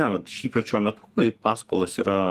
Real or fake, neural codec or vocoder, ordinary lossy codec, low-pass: fake; codec, 44.1 kHz, 2.6 kbps, SNAC; Opus, 24 kbps; 14.4 kHz